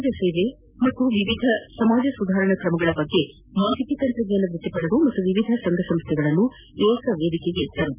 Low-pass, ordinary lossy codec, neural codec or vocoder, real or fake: 3.6 kHz; none; none; real